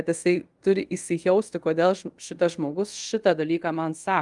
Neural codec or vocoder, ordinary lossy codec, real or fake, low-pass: codec, 24 kHz, 0.5 kbps, DualCodec; Opus, 32 kbps; fake; 10.8 kHz